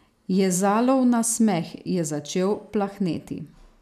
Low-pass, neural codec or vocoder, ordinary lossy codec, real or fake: 14.4 kHz; none; none; real